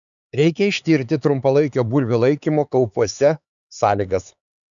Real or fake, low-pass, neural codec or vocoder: fake; 7.2 kHz; codec, 16 kHz, 4 kbps, X-Codec, HuBERT features, trained on LibriSpeech